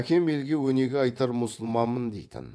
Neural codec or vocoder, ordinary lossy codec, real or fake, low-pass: vocoder, 22.05 kHz, 80 mel bands, WaveNeXt; none; fake; none